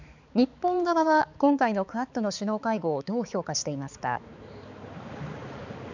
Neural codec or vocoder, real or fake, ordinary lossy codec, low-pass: codec, 16 kHz, 4 kbps, X-Codec, HuBERT features, trained on balanced general audio; fake; none; 7.2 kHz